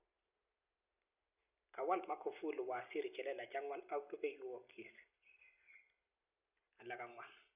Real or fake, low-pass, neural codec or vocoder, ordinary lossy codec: real; 3.6 kHz; none; none